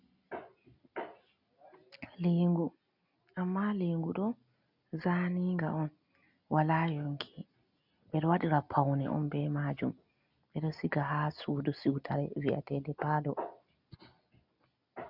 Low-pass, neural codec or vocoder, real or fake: 5.4 kHz; none; real